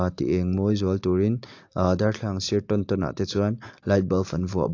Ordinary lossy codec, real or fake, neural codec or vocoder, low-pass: AAC, 48 kbps; real; none; 7.2 kHz